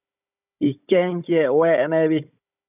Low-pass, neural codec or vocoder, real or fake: 3.6 kHz; codec, 16 kHz, 16 kbps, FunCodec, trained on Chinese and English, 50 frames a second; fake